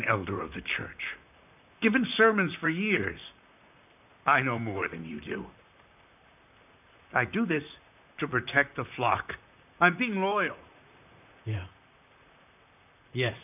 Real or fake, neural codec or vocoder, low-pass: fake; vocoder, 44.1 kHz, 128 mel bands, Pupu-Vocoder; 3.6 kHz